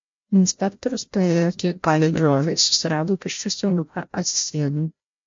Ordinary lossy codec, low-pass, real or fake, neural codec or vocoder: MP3, 48 kbps; 7.2 kHz; fake; codec, 16 kHz, 0.5 kbps, FreqCodec, larger model